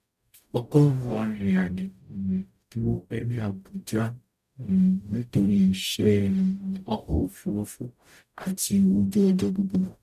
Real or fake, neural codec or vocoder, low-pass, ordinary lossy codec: fake; codec, 44.1 kHz, 0.9 kbps, DAC; 14.4 kHz; none